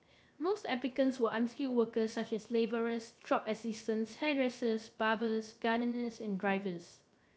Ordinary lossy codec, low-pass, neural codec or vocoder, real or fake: none; none; codec, 16 kHz, 0.7 kbps, FocalCodec; fake